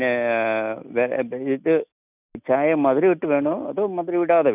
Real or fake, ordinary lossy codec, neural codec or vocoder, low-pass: real; none; none; 3.6 kHz